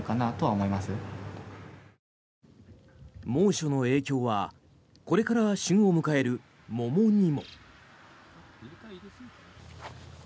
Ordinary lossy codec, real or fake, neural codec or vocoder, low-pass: none; real; none; none